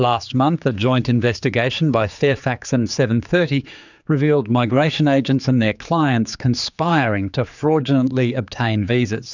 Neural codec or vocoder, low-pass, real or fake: codec, 16 kHz, 4 kbps, X-Codec, HuBERT features, trained on general audio; 7.2 kHz; fake